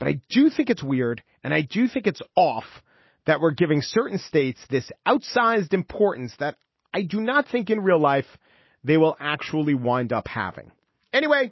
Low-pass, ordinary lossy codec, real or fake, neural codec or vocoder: 7.2 kHz; MP3, 24 kbps; real; none